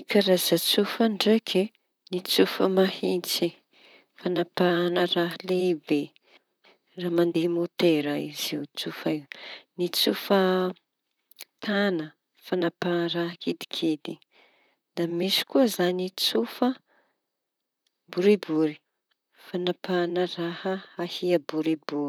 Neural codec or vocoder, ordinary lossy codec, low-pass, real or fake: vocoder, 44.1 kHz, 128 mel bands, Pupu-Vocoder; none; none; fake